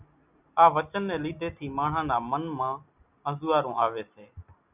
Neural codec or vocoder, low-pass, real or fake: none; 3.6 kHz; real